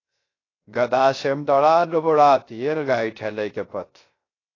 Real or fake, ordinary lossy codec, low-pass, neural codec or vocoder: fake; AAC, 32 kbps; 7.2 kHz; codec, 16 kHz, 0.3 kbps, FocalCodec